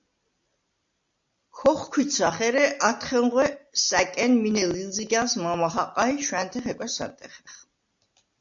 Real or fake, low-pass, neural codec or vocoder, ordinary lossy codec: real; 7.2 kHz; none; AAC, 64 kbps